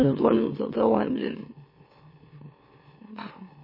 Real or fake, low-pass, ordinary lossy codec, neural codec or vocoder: fake; 5.4 kHz; MP3, 24 kbps; autoencoder, 44.1 kHz, a latent of 192 numbers a frame, MeloTTS